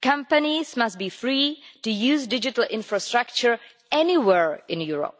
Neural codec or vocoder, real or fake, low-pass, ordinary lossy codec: none; real; none; none